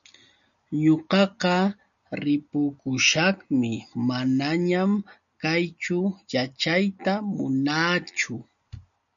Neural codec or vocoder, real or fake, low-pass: none; real; 7.2 kHz